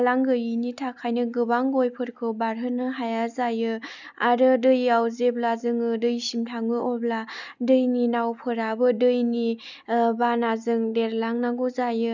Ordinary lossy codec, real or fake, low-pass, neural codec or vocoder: none; real; 7.2 kHz; none